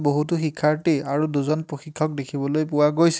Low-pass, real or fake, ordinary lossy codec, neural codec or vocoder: none; real; none; none